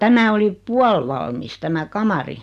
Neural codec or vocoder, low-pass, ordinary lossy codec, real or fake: none; 14.4 kHz; Opus, 64 kbps; real